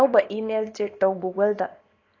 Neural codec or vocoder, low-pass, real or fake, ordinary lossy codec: codec, 24 kHz, 0.9 kbps, WavTokenizer, small release; 7.2 kHz; fake; AAC, 32 kbps